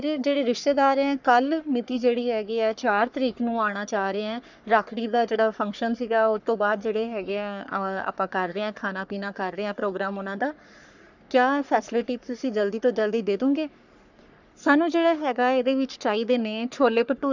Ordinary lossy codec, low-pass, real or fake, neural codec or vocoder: none; 7.2 kHz; fake; codec, 44.1 kHz, 3.4 kbps, Pupu-Codec